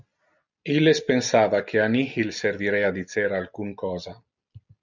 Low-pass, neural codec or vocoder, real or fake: 7.2 kHz; none; real